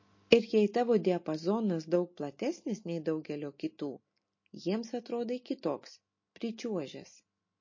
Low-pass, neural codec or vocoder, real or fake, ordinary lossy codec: 7.2 kHz; none; real; MP3, 32 kbps